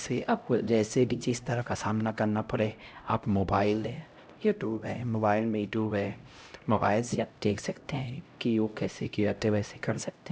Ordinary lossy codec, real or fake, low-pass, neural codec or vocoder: none; fake; none; codec, 16 kHz, 0.5 kbps, X-Codec, HuBERT features, trained on LibriSpeech